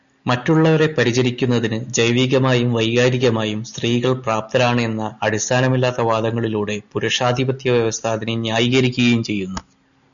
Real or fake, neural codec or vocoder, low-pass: real; none; 7.2 kHz